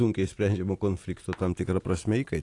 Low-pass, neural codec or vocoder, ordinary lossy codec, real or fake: 10.8 kHz; none; AAC, 48 kbps; real